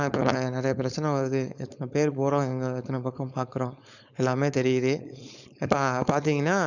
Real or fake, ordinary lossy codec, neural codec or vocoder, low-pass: fake; none; codec, 16 kHz, 4.8 kbps, FACodec; 7.2 kHz